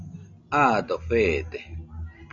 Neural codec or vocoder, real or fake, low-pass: none; real; 7.2 kHz